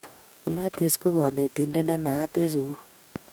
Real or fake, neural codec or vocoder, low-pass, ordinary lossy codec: fake; codec, 44.1 kHz, 2.6 kbps, DAC; none; none